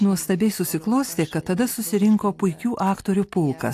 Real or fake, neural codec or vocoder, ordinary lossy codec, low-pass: real; none; AAC, 64 kbps; 14.4 kHz